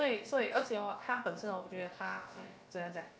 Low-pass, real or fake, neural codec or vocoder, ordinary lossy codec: none; fake; codec, 16 kHz, about 1 kbps, DyCAST, with the encoder's durations; none